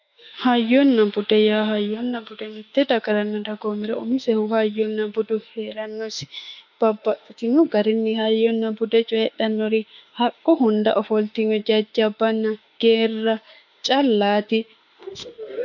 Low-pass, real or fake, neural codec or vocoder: 7.2 kHz; fake; autoencoder, 48 kHz, 32 numbers a frame, DAC-VAE, trained on Japanese speech